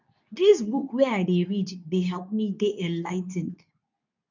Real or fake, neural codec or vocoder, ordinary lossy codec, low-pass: fake; codec, 24 kHz, 0.9 kbps, WavTokenizer, medium speech release version 2; none; 7.2 kHz